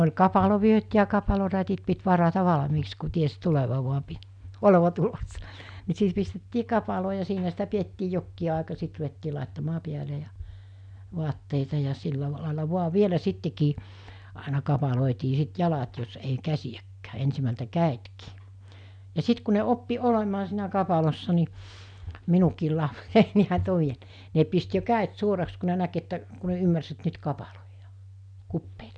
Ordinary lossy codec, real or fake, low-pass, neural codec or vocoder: none; real; 9.9 kHz; none